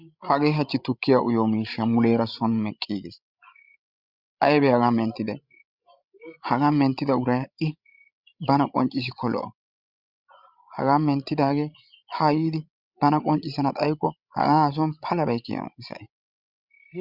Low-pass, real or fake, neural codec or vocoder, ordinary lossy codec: 5.4 kHz; fake; codec, 16 kHz, 16 kbps, FreqCodec, larger model; Opus, 64 kbps